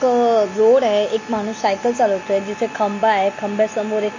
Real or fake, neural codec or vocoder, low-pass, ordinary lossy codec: real; none; 7.2 kHz; MP3, 32 kbps